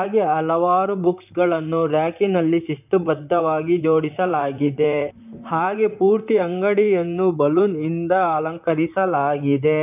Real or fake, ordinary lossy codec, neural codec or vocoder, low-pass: fake; none; vocoder, 44.1 kHz, 128 mel bands, Pupu-Vocoder; 3.6 kHz